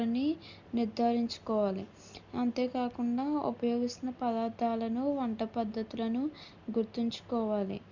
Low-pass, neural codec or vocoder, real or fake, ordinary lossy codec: 7.2 kHz; none; real; Opus, 64 kbps